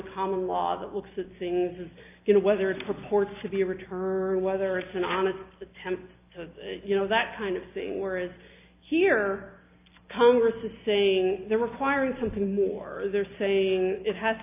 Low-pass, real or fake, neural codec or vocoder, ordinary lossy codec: 3.6 kHz; real; none; AAC, 32 kbps